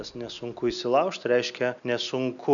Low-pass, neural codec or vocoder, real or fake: 7.2 kHz; none; real